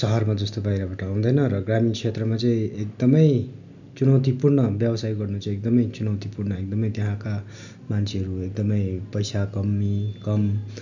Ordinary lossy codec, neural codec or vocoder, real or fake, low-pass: none; none; real; 7.2 kHz